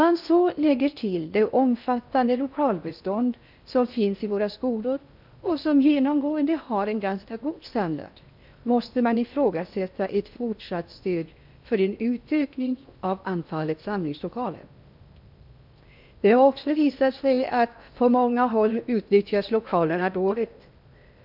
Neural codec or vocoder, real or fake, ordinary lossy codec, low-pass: codec, 16 kHz in and 24 kHz out, 0.6 kbps, FocalCodec, streaming, 4096 codes; fake; none; 5.4 kHz